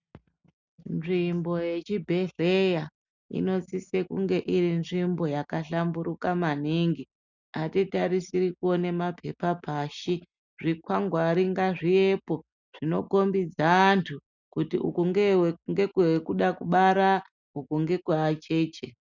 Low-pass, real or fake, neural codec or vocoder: 7.2 kHz; real; none